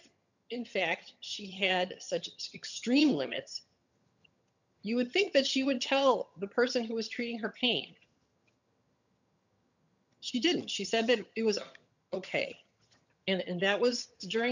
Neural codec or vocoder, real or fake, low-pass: vocoder, 22.05 kHz, 80 mel bands, HiFi-GAN; fake; 7.2 kHz